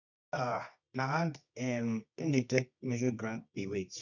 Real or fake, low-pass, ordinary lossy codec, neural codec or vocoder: fake; 7.2 kHz; none; codec, 24 kHz, 0.9 kbps, WavTokenizer, medium music audio release